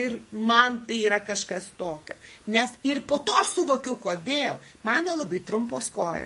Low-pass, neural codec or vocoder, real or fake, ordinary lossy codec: 14.4 kHz; codec, 44.1 kHz, 2.6 kbps, SNAC; fake; MP3, 48 kbps